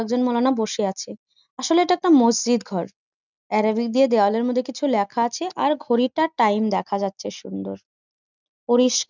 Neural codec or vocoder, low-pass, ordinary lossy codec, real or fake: none; 7.2 kHz; none; real